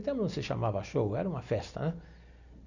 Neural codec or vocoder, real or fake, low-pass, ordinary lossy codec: none; real; 7.2 kHz; none